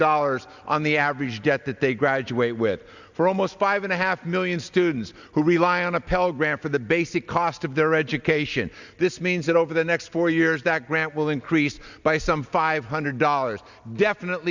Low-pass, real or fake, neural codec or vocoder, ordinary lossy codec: 7.2 kHz; real; none; Opus, 64 kbps